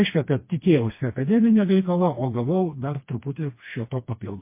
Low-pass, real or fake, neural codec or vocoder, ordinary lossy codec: 3.6 kHz; fake; codec, 16 kHz, 2 kbps, FreqCodec, smaller model; MP3, 24 kbps